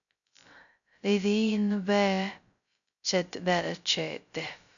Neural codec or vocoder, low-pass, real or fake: codec, 16 kHz, 0.2 kbps, FocalCodec; 7.2 kHz; fake